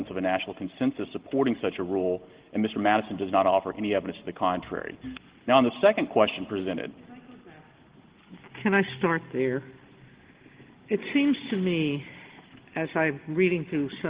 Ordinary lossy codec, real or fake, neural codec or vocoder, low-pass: Opus, 16 kbps; real; none; 3.6 kHz